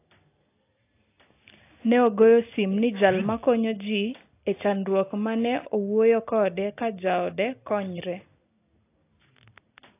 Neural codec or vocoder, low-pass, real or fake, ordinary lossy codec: none; 3.6 kHz; real; AAC, 24 kbps